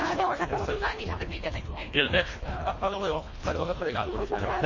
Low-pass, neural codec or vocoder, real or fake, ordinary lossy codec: 7.2 kHz; codec, 24 kHz, 1.5 kbps, HILCodec; fake; MP3, 32 kbps